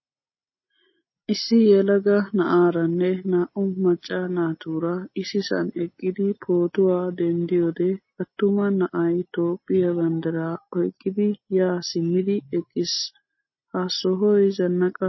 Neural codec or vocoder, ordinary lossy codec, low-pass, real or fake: none; MP3, 24 kbps; 7.2 kHz; real